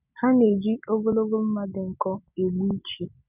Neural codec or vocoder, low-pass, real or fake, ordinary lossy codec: none; 3.6 kHz; real; none